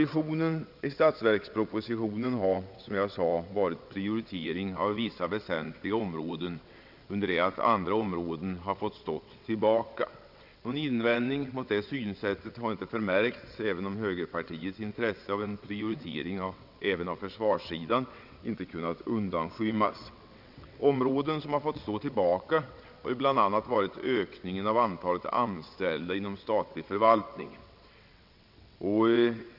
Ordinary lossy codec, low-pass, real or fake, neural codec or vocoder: none; 5.4 kHz; fake; vocoder, 22.05 kHz, 80 mel bands, WaveNeXt